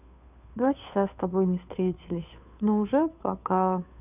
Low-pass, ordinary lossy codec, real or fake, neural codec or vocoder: 3.6 kHz; none; fake; codec, 16 kHz, 2 kbps, FunCodec, trained on Chinese and English, 25 frames a second